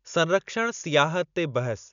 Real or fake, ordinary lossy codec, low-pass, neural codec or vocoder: real; none; 7.2 kHz; none